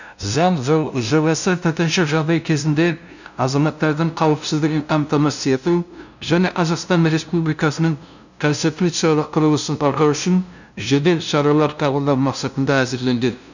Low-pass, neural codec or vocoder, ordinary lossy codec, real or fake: 7.2 kHz; codec, 16 kHz, 0.5 kbps, FunCodec, trained on LibriTTS, 25 frames a second; none; fake